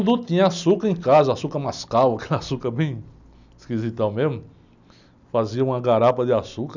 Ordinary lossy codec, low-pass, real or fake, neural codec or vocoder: none; 7.2 kHz; real; none